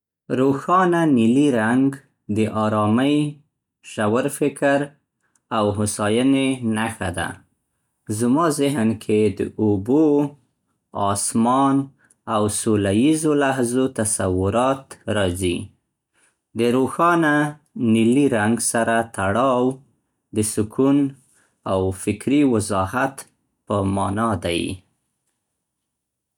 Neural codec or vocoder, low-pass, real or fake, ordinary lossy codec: none; 19.8 kHz; real; none